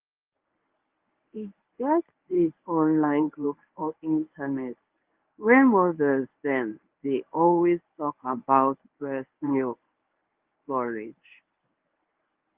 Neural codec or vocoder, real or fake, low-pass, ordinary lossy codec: codec, 24 kHz, 0.9 kbps, WavTokenizer, medium speech release version 1; fake; 3.6 kHz; Opus, 32 kbps